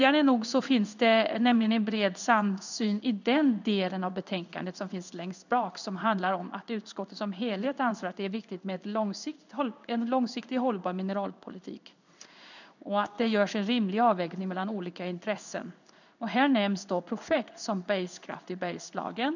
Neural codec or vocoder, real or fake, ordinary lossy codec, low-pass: codec, 16 kHz in and 24 kHz out, 1 kbps, XY-Tokenizer; fake; none; 7.2 kHz